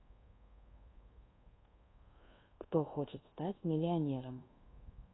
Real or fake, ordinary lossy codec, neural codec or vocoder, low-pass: fake; AAC, 16 kbps; codec, 24 kHz, 1.2 kbps, DualCodec; 7.2 kHz